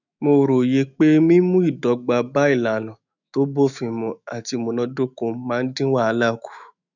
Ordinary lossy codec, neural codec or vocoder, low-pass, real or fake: none; autoencoder, 48 kHz, 128 numbers a frame, DAC-VAE, trained on Japanese speech; 7.2 kHz; fake